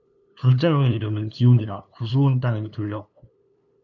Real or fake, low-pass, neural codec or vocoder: fake; 7.2 kHz; codec, 16 kHz, 2 kbps, FunCodec, trained on LibriTTS, 25 frames a second